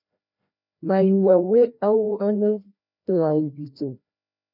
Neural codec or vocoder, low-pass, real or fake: codec, 16 kHz, 1 kbps, FreqCodec, larger model; 5.4 kHz; fake